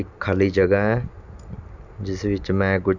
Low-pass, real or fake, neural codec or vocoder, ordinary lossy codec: 7.2 kHz; real; none; none